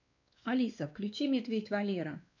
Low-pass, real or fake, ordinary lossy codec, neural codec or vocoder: 7.2 kHz; fake; none; codec, 16 kHz, 2 kbps, X-Codec, WavLM features, trained on Multilingual LibriSpeech